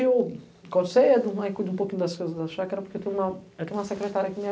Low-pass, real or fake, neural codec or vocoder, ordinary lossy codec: none; real; none; none